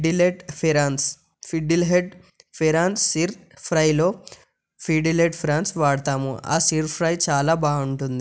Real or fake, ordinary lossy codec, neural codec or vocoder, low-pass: real; none; none; none